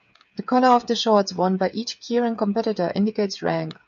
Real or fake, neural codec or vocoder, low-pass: fake; codec, 16 kHz, 8 kbps, FreqCodec, smaller model; 7.2 kHz